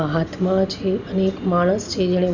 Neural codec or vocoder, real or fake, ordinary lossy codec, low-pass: none; real; none; 7.2 kHz